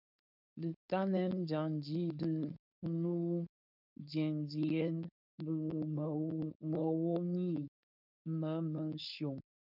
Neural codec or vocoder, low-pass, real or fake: codec, 16 kHz, 4.8 kbps, FACodec; 5.4 kHz; fake